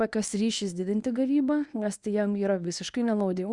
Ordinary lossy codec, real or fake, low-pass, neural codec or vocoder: Opus, 64 kbps; fake; 10.8 kHz; codec, 24 kHz, 0.9 kbps, WavTokenizer, medium speech release version 1